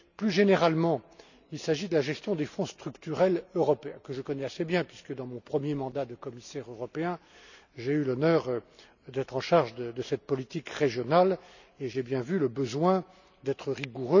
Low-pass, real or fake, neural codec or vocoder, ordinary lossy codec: 7.2 kHz; real; none; none